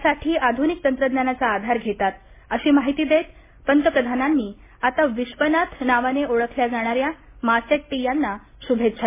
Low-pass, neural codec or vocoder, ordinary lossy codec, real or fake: 3.6 kHz; none; MP3, 16 kbps; real